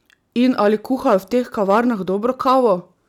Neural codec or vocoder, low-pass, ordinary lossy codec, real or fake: none; 19.8 kHz; none; real